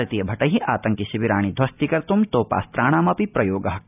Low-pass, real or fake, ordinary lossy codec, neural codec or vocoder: 3.6 kHz; real; none; none